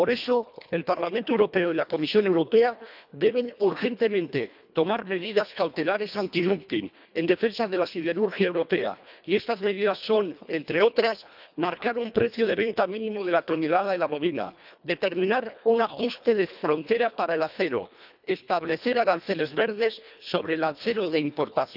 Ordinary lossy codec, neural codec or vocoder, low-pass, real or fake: none; codec, 24 kHz, 1.5 kbps, HILCodec; 5.4 kHz; fake